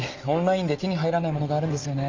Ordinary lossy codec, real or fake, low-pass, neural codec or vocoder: Opus, 32 kbps; real; 7.2 kHz; none